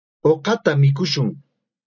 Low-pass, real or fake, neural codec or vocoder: 7.2 kHz; real; none